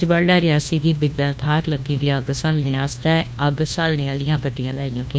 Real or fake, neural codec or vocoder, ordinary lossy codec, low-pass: fake; codec, 16 kHz, 1 kbps, FunCodec, trained on LibriTTS, 50 frames a second; none; none